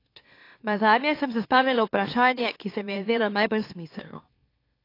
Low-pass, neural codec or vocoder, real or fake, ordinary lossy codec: 5.4 kHz; autoencoder, 44.1 kHz, a latent of 192 numbers a frame, MeloTTS; fake; AAC, 32 kbps